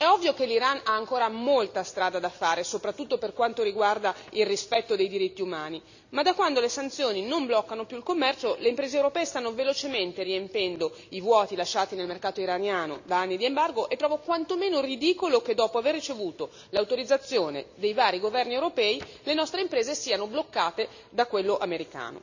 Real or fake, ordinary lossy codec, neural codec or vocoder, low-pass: real; none; none; 7.2 kHz